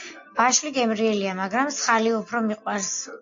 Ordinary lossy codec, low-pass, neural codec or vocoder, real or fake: AAC, 48 kbps; 7.2 kHz; none; real